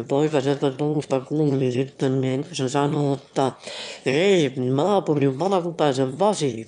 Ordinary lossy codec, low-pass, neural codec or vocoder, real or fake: none; 9.9 kHz; autoencoder, 22.05 kHz, a latent of 192 numbers a frame, VITS, trained on one speaker; fake